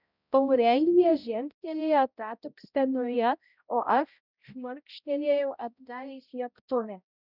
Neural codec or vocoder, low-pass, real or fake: codec, 16 kHz, 0.5 kbps, X-Codec, HuBERT features, trained on balanced general audio; 5.4 kHz; fake